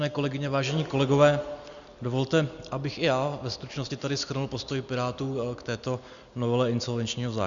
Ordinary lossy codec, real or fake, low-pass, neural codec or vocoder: Opus, 64 kbps; real; 7.2 kHz; none